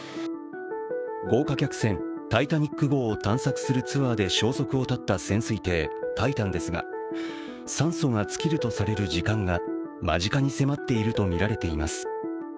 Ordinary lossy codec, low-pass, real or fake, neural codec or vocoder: none; none; fake; codec, 16 kHz, 6 kbps, DAC